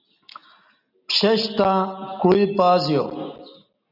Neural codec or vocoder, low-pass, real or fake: none; 5.4 kHz; real